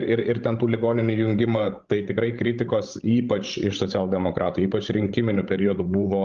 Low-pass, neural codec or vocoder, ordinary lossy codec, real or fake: 7.2 kHz; codec, 16 kHz, 16 kbps, FreqCodec, larger model; Opus, 24 kbps; fake